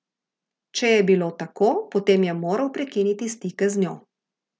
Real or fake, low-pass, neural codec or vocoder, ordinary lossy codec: real; none; none; none